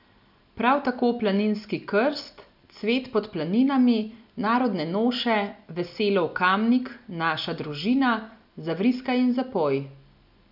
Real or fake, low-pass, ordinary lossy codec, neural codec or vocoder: real; 5.4 kHz; none; none